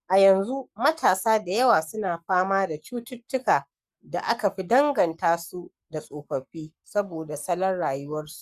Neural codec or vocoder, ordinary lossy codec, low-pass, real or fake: codec, 44.1 kHz, 7.8 kbps, Pupu-Codec; Opus, 64 kbps; 14.4 kHz; fake